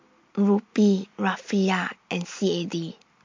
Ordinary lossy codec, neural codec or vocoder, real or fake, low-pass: MP3, 48 kbps; none; real; 7.2 kHz